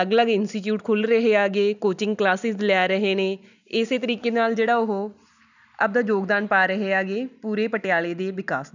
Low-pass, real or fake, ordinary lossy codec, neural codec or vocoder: 7.2 kHz; real; none; none